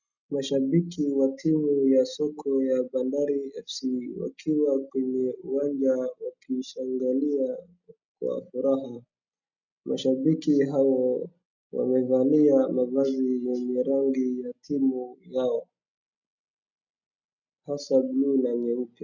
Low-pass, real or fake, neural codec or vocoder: 7.2 kHz; real; none